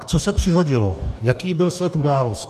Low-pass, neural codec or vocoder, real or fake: 14.4 kHz; codec, 44.1 kHz, 2.6 kbps, DAC; fake